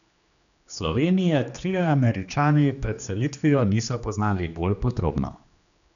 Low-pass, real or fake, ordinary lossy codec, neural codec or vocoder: 7.2 kHz; fake; none; codec, 16 kHz, 2 kbps, X-Codec, HuBERT features, trained on general audio